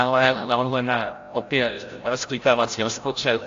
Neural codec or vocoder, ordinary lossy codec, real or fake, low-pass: codec, 16 kHz, 0.5 kbps, FreqCodec, larger model; AAC, 48 kbps; fake; 7.2 kHz